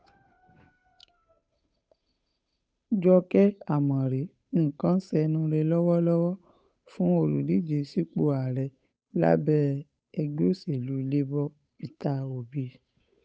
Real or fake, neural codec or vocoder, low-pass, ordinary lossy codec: fake; codec, 16 kHz, 8 kbps, FunCodec, trained on Chinese and English, 25 frames a second; none; none